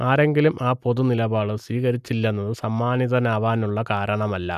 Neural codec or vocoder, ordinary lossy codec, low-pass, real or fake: none; none; 14.4 kHz; real